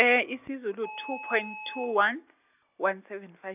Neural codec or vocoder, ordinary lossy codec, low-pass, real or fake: none; none; 3.6 kHz; real